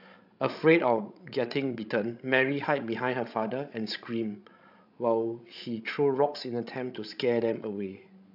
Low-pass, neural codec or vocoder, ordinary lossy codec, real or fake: 5.4 kHz; codec, 16 kHz, 16 kbps, FreqCodec, larger model; AAC, 48 kbps; fake